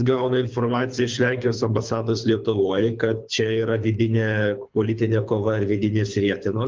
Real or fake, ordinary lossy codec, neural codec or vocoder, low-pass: fake; Opus, 24 kbps; codec, 24 kHz, 3 kbps, HILCodec; 7.2 kHz